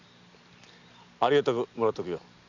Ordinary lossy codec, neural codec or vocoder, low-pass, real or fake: none; none; 7.2 kHz; real